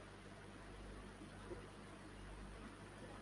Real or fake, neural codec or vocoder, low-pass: real; none; 10.8 kHz